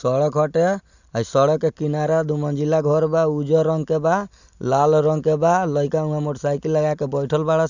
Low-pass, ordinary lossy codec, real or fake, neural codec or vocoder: 7.2 kHz; none; real; none